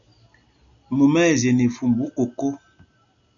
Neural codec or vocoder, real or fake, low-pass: none; real; 7.2 kHz